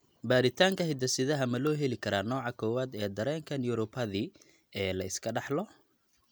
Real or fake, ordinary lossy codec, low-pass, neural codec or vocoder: real; none; none; none